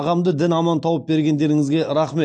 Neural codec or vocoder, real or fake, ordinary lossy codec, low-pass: none; real; AAC, 64 kbps; 9.9 kHz